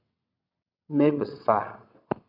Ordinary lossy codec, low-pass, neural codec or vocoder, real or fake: AAC, 32 kbps; 5.4 kHz; vocoder, 22.05 kHz, 80 mel bands, WaveNeXt; fake